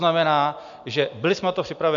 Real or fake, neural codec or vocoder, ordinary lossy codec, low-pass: real; none; MP3, 64 kbps; 7.2 kHz